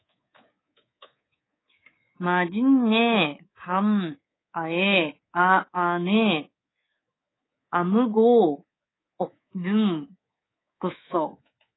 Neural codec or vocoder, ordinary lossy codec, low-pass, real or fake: codec, 24 kHz, 3.1 kbps, DualCodec; AAC, 16 kbps; 7.2 kHz; fake